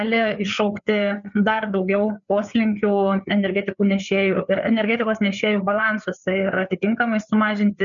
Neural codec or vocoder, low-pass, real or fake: codec, 16 kHz, 4 kbps, FreqCodec, larger model; 7.2 kHz; fake